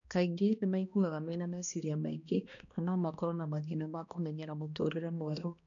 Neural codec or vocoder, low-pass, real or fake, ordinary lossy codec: codec, 16 kHz, 1 kbps, X-Codec, HuBERT features, trained on balanced general audio; 7.2 kHz; fake; none